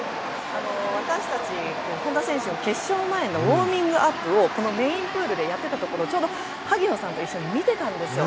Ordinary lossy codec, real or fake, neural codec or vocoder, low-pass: none; real; none; none